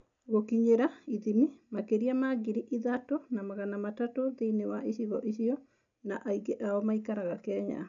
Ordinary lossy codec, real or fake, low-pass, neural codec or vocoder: none; real; 7.2 kHz; none